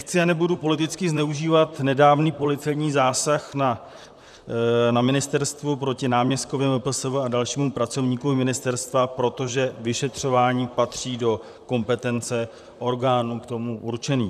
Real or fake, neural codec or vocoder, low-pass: fake; vocoder, 44.1 kHz, 128 mel bands, Pupu-Vocoder; 14.4 kHz